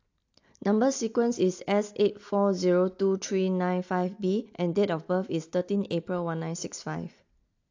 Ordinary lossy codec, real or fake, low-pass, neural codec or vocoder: AAC, 48 kbps; real; 7.2 kHz; none